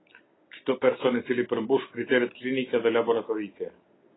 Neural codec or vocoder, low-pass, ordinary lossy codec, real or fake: none; 7.2 kHz; AAC, 16 kbps; real